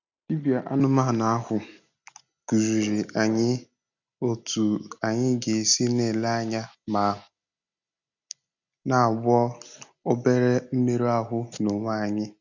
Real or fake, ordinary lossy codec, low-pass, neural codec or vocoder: real; none; 7.2 kHz; none